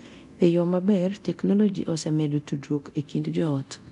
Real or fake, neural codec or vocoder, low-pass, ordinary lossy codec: fake; codec, 24 kHz, 0.9 kbps, DualCodec; 10.8 kHz; none